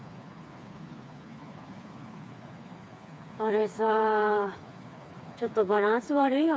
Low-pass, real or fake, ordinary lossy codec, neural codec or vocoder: none; fake; none; codec, 16 kHz, 4 kbps, FreqCodec, smaller model